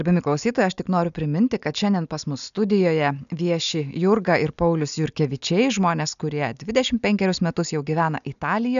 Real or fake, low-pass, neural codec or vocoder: real; 7.2 kHz; none